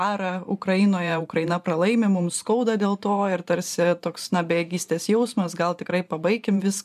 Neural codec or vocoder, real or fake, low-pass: vocoder, 44.1 kHz, 128 mel bands every 512 samples, BigVGAN v2; fake; 14.4 kHz